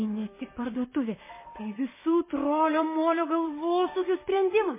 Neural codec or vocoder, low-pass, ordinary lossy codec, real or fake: vocoder, 44.1 kHz, 128 mel bands, Pupu-Vocoder; 3.6 kHz; MP3, 16 kbps; fake